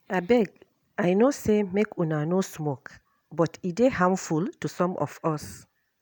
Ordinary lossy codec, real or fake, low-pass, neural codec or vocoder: none; real; none; none